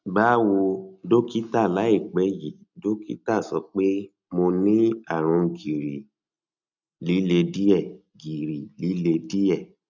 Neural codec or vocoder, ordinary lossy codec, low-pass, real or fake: none; none; 7.2 kHz; real